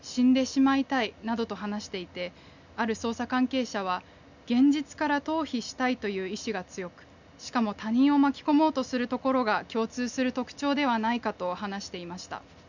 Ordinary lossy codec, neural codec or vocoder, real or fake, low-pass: none; none; real; 7.2 kHz